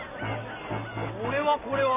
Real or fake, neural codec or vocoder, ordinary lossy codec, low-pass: real; none; none; 3.6 kHz